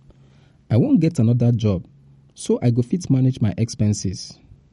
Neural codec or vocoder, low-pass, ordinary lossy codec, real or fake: none; 14.4 kHz; MP3, 48 kbps; real